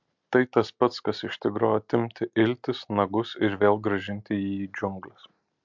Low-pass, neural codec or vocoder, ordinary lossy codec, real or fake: 7.2 kHz; none; MP3, 64 kbps; real